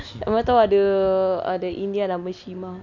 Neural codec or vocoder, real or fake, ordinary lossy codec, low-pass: none; real; none; 7.2 kHz